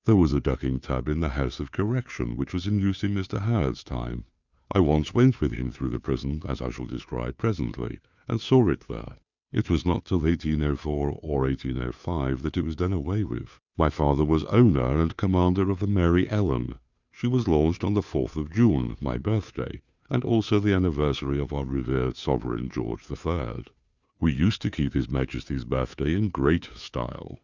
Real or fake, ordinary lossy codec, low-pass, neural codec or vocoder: fake; Opus, 64 kbps; 7.2 kHz; codec, 16 kHz, 2 kbps, FunCodec, trained on Chinese and English, 25 frames a second